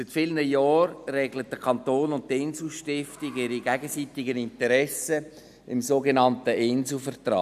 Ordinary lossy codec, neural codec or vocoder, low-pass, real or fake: none; none; 14.4 kHz; real